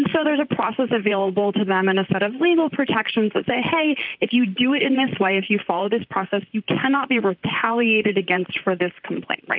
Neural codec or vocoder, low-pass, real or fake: vocoder, 44.1 kHz, 128 mel bands, Pupu-Vocoder; 5.4 kHz; fake